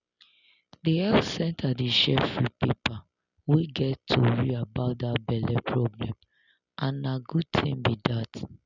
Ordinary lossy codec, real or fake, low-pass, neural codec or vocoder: none; real; 7.2 kHz; none